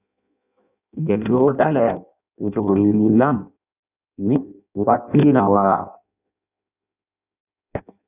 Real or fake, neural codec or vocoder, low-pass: fake; codec, 16 kHz in and 24 kHz out, 0.6 kbps, FireRedTTS-2 codec; 3.6 kHz